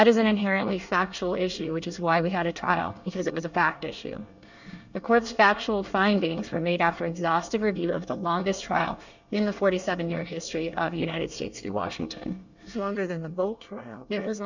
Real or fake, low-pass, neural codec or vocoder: fake; 7.2 kHz; codec, 24 kHz, 1 kbps, SNAC